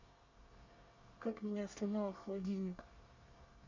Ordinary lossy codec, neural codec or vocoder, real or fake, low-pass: Opus, 64 kbps; codec, 24 kHz, 1 kbps, SNAC; fake; 7.2 kHz